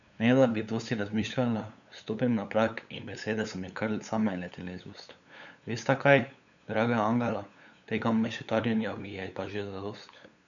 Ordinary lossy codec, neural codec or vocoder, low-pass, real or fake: none; codec, 16 kHz, 8 kbps, FunCodec, trained on LibriTTS, 25 frames a second; 7.2 kHz; fake